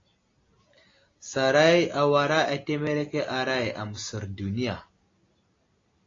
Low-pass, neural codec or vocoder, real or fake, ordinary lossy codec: 7.2 kHz; none; real; AAC, 32 kbps